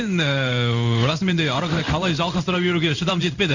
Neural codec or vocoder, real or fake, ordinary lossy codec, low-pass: codec, 16 kHz in and 24 kHz out, 1 kbps, XY-Tokenizer; fake; none; 7.2 kHz